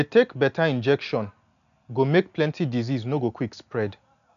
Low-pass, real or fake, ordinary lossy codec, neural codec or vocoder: 7.2 kHz; real; none; none